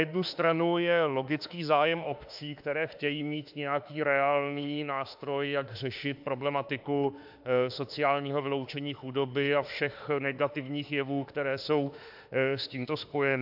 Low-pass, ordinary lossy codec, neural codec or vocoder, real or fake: 5.4 kHz; AAC, 48 kbps; autoencoder, 48 kHz, 32 numbers a frame, DAC-VAE, trained on Japanese speech; fake